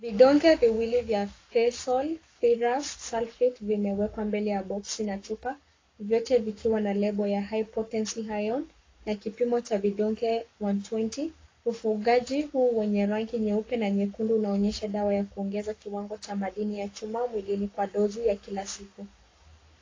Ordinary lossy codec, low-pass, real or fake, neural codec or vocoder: AAC, 32 kbps; 7.2 kHz; fake; codec, 44.1 kHz, 7.8 kbps, Pupu-Codec